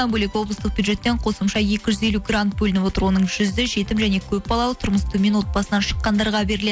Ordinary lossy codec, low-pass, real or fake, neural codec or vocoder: none; none; real; none